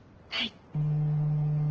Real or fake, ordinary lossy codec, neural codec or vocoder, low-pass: real; Opus, 16 kbps; none; 7.2 kHz